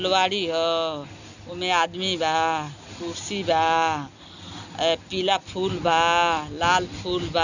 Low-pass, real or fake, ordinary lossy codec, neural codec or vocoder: 7.2 kHz; real; none; none